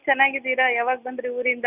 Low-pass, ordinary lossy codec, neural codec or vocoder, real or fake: 3.6 kHz; none; none; real